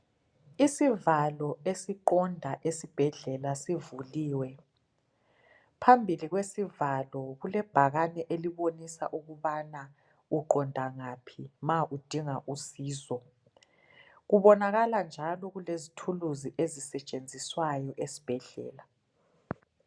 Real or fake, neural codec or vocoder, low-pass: real; none; 9.9 kHz